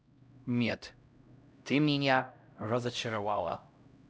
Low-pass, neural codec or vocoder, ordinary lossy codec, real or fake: none; codec, 16 kHz, 0.5 kbps, X-Codec, HuBERT features, trained on LibriSpeech; none; fake